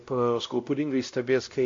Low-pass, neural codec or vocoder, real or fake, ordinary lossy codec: 7.2 kHz; codec, 16 kHz, 0.5 kbps, X-Codec, WavLM features, trained on Multilingual LibriSpeech; fake; AAC, 48 kbps